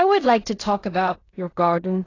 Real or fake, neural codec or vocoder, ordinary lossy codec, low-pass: fake; codec, 16 kHz in and 24 kHz out, 0.4 kbps, LongCat-Audio-Codec, fine tuned four codebook decoder; AAC, 32 kbps; 7.2 kHz